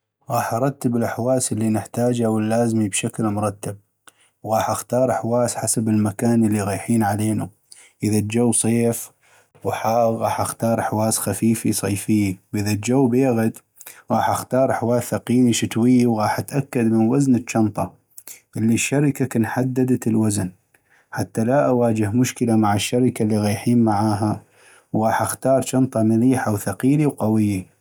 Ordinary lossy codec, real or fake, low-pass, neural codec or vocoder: none; real; none; none